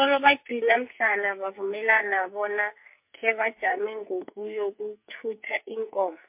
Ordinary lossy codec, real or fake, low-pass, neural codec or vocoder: MP3, 32 kbps; fake; 3.6 kHz; codec, 44.1 kHz, 2.6 kbps, SNAC